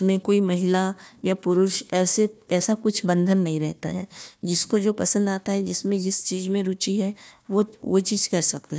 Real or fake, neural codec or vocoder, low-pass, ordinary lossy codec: fake; codec, 16 kHz, 1 kbps, FunCodec, trained on Chinese and English, 50 frames a second; none; none